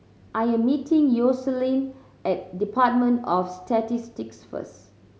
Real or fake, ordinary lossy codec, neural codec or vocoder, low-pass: real; none; none; none